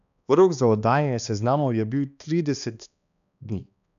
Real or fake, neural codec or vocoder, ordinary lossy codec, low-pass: fake; codec, 16 kHz, 2 kbps, X-Codec, HuBERT features, trained on balanced general audio; none; 7.2 kHz